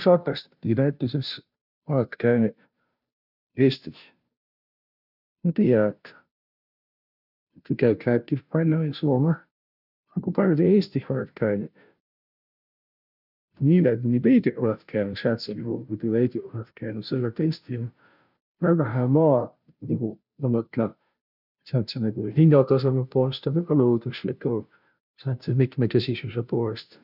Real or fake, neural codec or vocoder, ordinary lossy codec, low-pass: fake; codec, 16 kHz, 0.5 kbps, FunCodec, trained on Chinese and English, 25 frames a second; none; 5.4 kHz